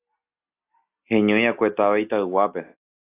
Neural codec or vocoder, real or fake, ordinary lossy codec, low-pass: none; real; AAC, 24 kbps; 3.6 kHz